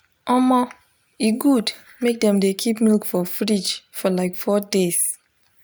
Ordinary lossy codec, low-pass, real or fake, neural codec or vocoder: none; none; real; none